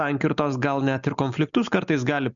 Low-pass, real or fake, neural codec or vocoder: 7.2 kHz; real; none